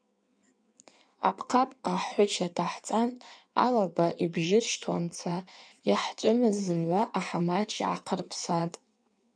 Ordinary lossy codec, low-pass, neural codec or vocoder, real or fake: MP3, 96 kbps; 9.9 kHz; codec, 16 kHz in and 24 kHz out, 1.1 kbps, FireRedTTS-2 codec; fake